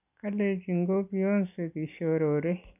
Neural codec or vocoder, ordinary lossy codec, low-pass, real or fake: none; none; 3.6 kHz; real